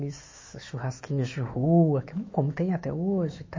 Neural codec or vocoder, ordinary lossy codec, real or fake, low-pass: autoencoder, 48 kHz, 128 numbers a frame, DAC-VAE, trained on Japanese speech; MP3, 32 kbps; fake; 7.2 kHz